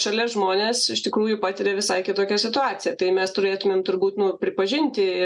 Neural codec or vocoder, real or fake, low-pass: none; real; 10.8 kHz